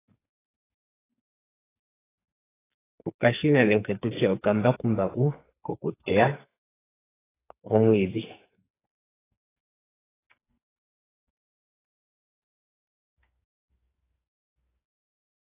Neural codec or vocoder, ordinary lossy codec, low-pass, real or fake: codec, 44.1 kHz, 2.6 kbps, SNAC; AAC, 16 kbps; 3.6 kHz; fake